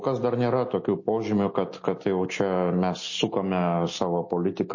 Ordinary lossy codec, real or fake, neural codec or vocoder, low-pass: MP3, 32 kbps; real; none; 7.2 kHz